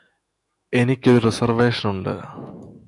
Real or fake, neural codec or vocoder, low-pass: fake; autoencoder, 48 kHz, 128 numbers a frame, DAC-VAE, trained on Japanese speech; 10.8 kHz